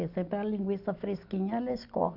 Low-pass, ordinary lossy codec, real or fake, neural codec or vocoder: 5.4 kHz; none; real; none